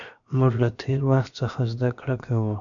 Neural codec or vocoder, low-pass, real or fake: codec, 16 kHz, 0.7 kbps, FocalCodec; 7.2 kHz; fake